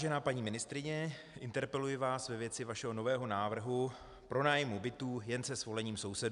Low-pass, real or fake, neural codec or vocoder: 10.8 kHz; real; none